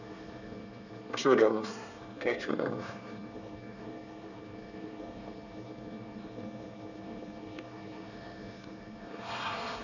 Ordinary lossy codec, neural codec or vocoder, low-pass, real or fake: none; codec, 24 kHz, 1 kbps, SNAC; 7.2 kHz; fake